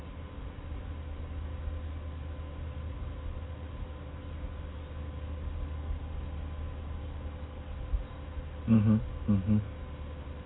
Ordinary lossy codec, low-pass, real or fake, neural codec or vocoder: AAC, 16 kbps; 7.2 kHz; real; none